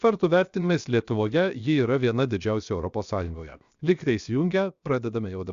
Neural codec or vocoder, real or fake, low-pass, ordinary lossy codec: codec, 16 kHz, 0.7 kbps, FocalCodec; fake; 7.2 kHz; Opus, 64 kbps